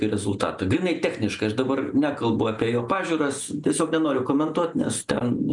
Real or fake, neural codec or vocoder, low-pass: real; none; 10.8 kHz